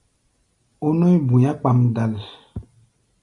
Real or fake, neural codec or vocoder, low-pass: real; none; 10.8 kHz